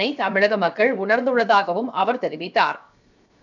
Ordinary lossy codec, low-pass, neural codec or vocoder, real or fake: none; 7.2 kHz; codec, 16 kHz, 0.7 kbps, FocalCodec; fake